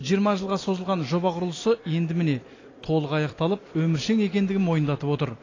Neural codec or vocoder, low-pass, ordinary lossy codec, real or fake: none; 7.2 kHz; AAC, 32 kbps; real